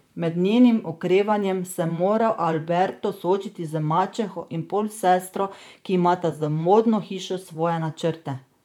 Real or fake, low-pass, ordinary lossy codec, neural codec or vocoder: fake; 19.8 kHz; none; vocoder, 44.1 kHz, 128 mel bands, Pupu-Vocoder